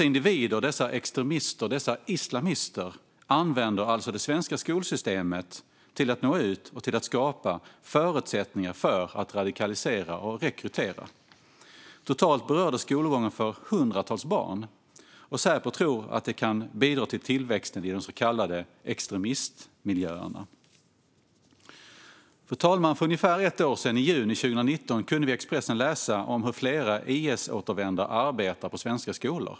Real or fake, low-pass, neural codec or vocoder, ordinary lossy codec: real; none; none; none